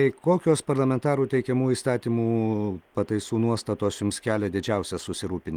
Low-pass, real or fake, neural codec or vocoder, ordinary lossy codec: 19.8 kHz; real; none; Opus, 24 kbps